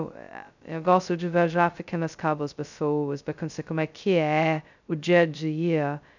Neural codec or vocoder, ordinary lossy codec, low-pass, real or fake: codec, 16 kHz, 0.2 kbps, FocalCodec; none; 7.2 kHz; fake